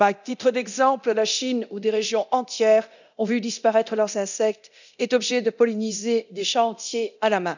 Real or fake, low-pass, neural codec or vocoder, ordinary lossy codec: fake; 7.2 kHz; codec, 24 kHz, 0.9 kbps, DualCodec; none